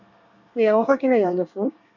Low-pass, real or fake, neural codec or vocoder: 7.2 kHz; fake; codec, 24 kHz, 1 kbps, SNAC